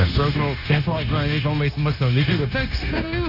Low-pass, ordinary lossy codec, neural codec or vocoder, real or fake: 5.4 kHz; none; codec, 16 kHz, 0.9 kbps, LongCat-Audio-Codec; fake